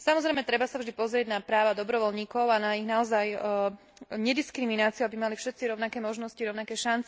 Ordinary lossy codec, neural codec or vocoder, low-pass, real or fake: none; none; none; real